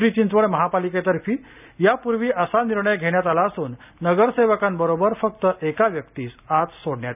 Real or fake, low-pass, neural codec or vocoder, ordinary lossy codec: real; 3.6 kHz; none; none